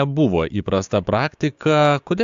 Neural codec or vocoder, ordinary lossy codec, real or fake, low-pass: codec, 16 kHz, 6 kbps, DAC; AAC, 64 kbps; fake; 7.2 kHz